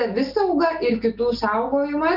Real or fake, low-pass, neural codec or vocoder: real; 5.4 kHz; none